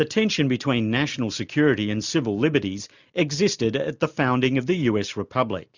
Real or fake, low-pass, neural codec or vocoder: real; 7.2 kHz; none